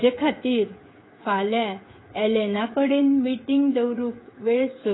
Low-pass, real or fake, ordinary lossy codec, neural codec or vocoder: 7.2 kHz; fake; AAC, 16 kbps; codec, 16 kHz, 16 kbps, FreqCodec, smaller model